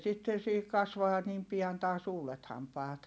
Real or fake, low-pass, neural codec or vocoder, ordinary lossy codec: real; none; none; none